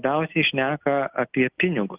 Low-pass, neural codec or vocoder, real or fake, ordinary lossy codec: 3.6 kHz; none; real; Opus, 32 kbps